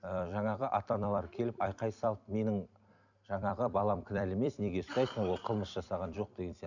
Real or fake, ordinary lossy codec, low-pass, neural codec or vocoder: fake; none; 7.2 kHz; vocoder, 44.1 kHz, 128 mel bands every 256 samples, BigVGAN v2